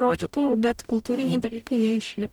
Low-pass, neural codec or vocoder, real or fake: 19.8 kHz; codec, 44.1 kHz, 0.9 kbps, DAC; fake